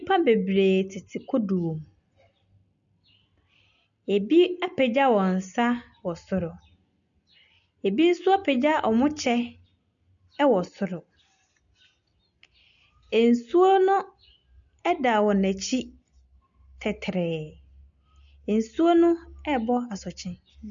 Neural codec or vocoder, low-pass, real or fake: none; 7.2 kHz; real